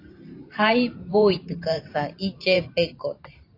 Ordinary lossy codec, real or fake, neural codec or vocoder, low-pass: AAC, 32 kbps; fake; vocoder, 44.1 kHz, 128 mel bands every 512 samples, BigVGAN v2; 5.4 kHz